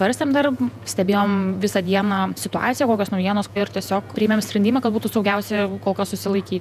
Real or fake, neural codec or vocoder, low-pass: fake; vocoder, 48 kHz, 128 mel bands, Vocos; 14.4 kHz